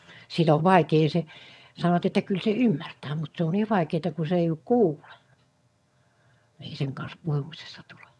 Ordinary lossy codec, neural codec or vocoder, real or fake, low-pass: none; vocoder, 22.05 kHz, 80 mel bands, HiFi-GAN; fake; none